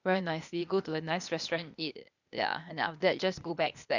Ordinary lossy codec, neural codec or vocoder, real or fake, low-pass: none; codec, 16 kHz, 0.8 kbps, ZipCodec; fake; 7.2 kHz